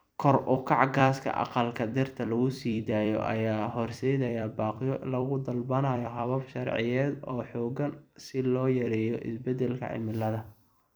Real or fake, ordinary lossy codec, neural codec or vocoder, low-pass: fake; none; vocoder, 44.1 kHz, 128 mel bands every 256 samples, BigVGAN v2; none